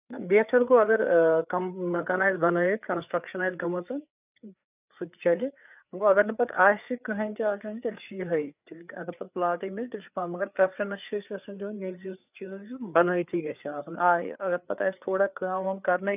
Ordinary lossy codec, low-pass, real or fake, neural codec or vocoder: none; 3.6 kHz; fake; codec, 16 kHz, 4 kbps, FreqCodec, larger model